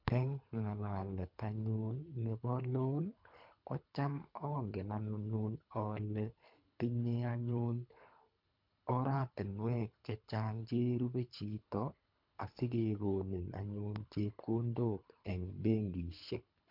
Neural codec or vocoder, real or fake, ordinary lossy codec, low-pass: codec, 24 kHz, 3 kbps, HILCodec; fake; MP3, 48 kbps; 5.4 kHz